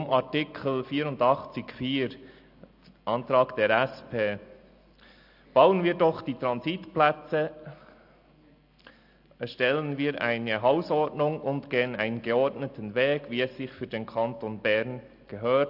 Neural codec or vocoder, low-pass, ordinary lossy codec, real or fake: none; 5.4 kHz; AAC, 48 kbps; real